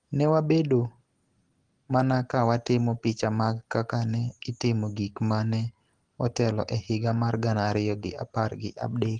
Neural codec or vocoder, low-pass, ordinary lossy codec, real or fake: none; 9.9 kHz; Opus, 16 kbps; real